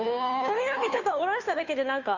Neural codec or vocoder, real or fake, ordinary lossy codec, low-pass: codec, 16 kHz, 2 kbps, FunCodec, trained on Chinese and English, 25 frames a second; fake; MP3, 64 kbps; 7.2 kHz